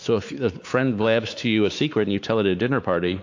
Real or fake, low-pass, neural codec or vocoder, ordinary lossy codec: fake; 7.2 kHz; codec, 16 kHz, 4 kbps, X-Codec, WavLM features, trained on Multilingual LibriSpeech; AAC, 48 kbps